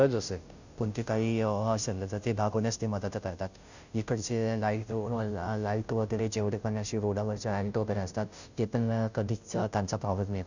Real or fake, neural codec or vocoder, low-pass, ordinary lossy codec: fake; codec, 16 kHz, 0.5 kbps, FunCodec, trained on Chinese and English, 25 frames a second; 7.2 kHz; none